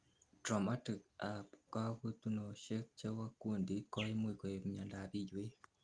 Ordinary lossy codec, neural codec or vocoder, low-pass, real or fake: Opus, 32 kbps; none; 9.9 kHz; real